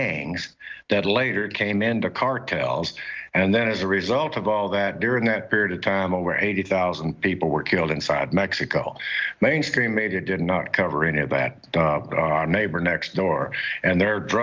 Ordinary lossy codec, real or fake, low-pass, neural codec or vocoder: Opus, 24 kbps; real; 7.2 kHz; none